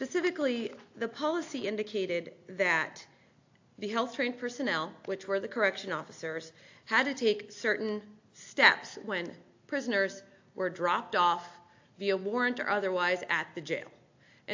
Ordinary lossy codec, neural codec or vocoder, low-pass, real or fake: AAC, 48 kbps; none; 7.2 kHz; real